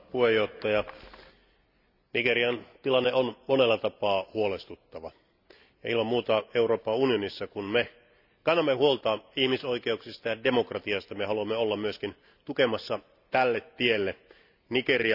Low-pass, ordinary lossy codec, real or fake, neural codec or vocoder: 5.4 kHz; none; real; none